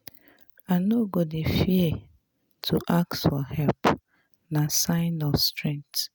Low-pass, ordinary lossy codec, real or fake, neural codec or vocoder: none; none; real; none